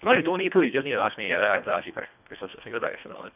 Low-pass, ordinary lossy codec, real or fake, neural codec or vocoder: 3.6 kHz; none; fake; codec, 24 kHz, 1.5 kbps, HILCodec